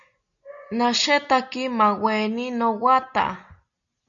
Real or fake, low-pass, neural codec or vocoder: real; 7.2 kHz; none